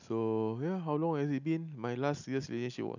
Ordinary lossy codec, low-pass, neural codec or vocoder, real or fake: none; 7.2 kHz; none; real